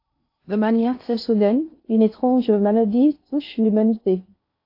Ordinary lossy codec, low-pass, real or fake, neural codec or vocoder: AAC, 32 kbps; 5.4 kHz; fake; codec, 16 kHz in and 24 kHz out, 0.6 kbps, FocalCodec, streaming, 2048 codes